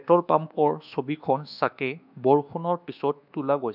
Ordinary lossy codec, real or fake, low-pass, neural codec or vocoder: none; fake; 5.4 kHz; codec, 24 kHz, 1.2 kbps, DualCodec